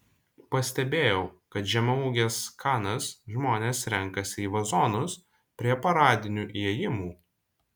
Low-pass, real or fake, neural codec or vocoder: 19.8 kHz; real; none